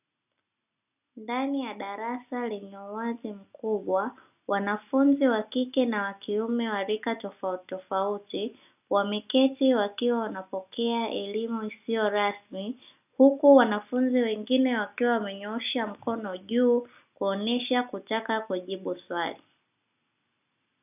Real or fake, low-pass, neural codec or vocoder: real; 3.6 kHz; none